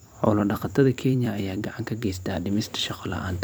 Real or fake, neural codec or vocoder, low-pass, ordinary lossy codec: fake; vocoder, 44.1 kHz, 128 mel bands every 256 samples, BigVGAN v2; none; none